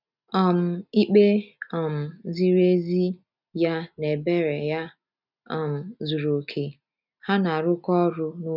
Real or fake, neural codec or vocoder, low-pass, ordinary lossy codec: real; none; 5.4 kHz; none